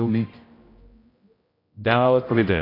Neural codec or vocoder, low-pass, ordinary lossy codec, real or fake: codec, 16 kHz, 0.5 kbps, X-Codec, HuBERT features, trained on general audio; 5.4 kHz; AAC, 24 kbps; fake